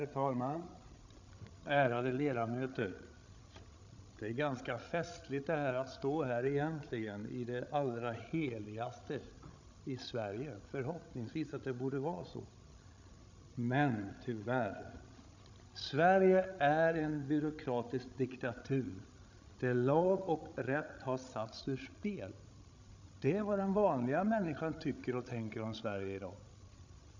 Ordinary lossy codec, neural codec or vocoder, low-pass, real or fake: none; codec, 16 kHz, 16 kbps, FreqCodec, larger model; 7.2 kHz; fake